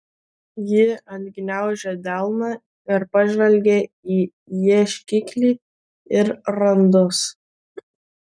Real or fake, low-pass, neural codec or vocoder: real; 9.9 kHz; none